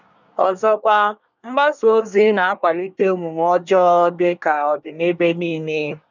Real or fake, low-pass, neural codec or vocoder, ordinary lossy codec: fake; 7.2 kHz; codec, 24 kHz, 1 kbps, SNAC; none